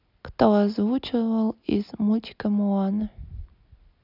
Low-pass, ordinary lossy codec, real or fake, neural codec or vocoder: 5.4 kHz; none; real; none